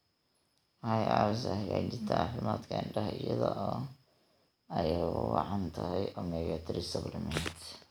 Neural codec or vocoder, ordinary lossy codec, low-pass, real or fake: none; none; none; real